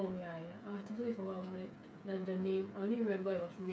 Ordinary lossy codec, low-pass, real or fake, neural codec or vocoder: none; none; fake; codec, 16 kHz, 8 kbps, FreqCodec, smaller model